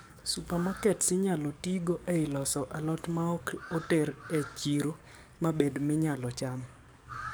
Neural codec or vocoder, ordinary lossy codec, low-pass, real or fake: codec, 44.1 kHz, 7.8 kbps, DAC; none; none; fake